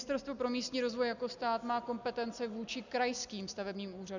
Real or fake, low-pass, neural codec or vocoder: real; 7.2 kHz; none